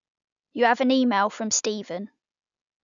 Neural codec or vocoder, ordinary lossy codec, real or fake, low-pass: none; none; real; 7.2 kHz